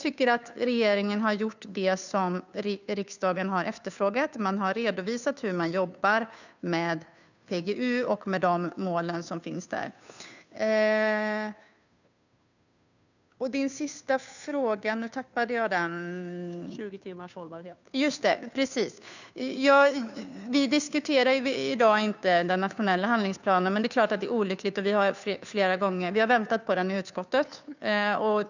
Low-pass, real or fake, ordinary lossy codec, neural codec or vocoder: 7.2 kHz; fake; none; codec, 16 kHz, 2 kbps, FunCodec, trained on Chinese and English, 25 frames a second